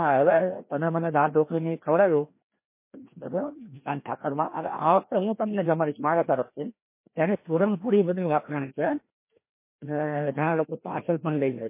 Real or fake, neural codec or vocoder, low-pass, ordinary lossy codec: fake; codec, 16 kHz, 1 kbps, FreqCodec, larger model; 3.6 kHz; MP3, 24 kbps